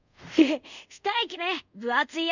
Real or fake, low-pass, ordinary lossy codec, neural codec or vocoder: fake; 7.2 kHz; none; codec, 24 kHz, 0.5 kbps, DualCodec